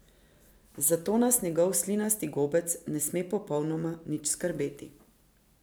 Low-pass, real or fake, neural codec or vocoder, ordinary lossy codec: none; real; none; none